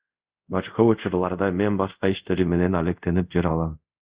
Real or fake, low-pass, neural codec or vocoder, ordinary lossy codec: fake; 3.6 kHz; codec, 24 kHz, 0.5 kbps, DualCodec; Opus, 64 kbps